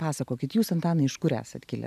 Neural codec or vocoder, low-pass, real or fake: none; 14.4 kHz; real